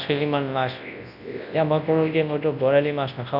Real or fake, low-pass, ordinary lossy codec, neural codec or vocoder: fake; 5.4 kHz; none; codec, 24 kHz, 0.9 kbps, WavTokenizer, large speech release